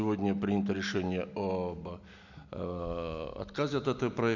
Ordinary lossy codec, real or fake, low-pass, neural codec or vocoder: none; real; 7.2 kHz; none